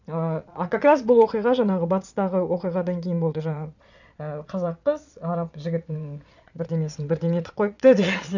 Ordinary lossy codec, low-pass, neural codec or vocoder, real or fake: none; 7.2 kHz; vocoder, 22.05 kHz, 80 mel bands, Vocos; fake